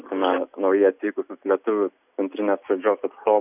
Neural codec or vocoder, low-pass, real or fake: none; 3.6 kHz; real